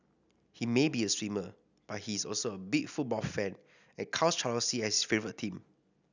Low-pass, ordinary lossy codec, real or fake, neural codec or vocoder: 7.2 kHz; none; real; none